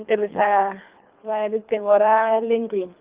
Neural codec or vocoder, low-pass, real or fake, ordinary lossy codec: codec, 24 kHz, 3 kbps, HILCodec; 3.6 kHz; fake; Opus, 32 kbps